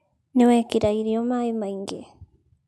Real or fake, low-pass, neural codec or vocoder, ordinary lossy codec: real; none; none; none